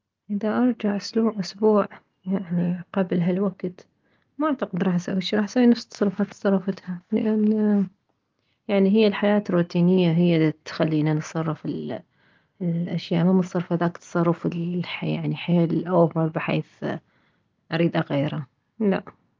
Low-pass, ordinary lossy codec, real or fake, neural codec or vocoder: 7.2 kHz; Opus, 24 kbps; real; none